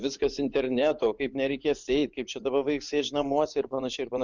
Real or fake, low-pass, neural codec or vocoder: fake; 7.2 kHz; vocoder, 24 kHz, 100 mel bands, Vocos